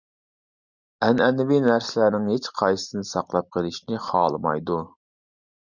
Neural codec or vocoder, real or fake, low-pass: none; real; 7.2 kHz